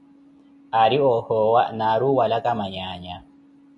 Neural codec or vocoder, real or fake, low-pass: none; real; 10.8 kHz